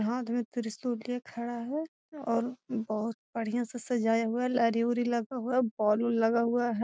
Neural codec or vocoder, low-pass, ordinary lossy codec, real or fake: none; none; none; real